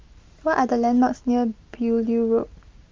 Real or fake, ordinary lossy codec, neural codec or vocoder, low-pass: real; Opus, 32 kbps; none; 7.2 kHz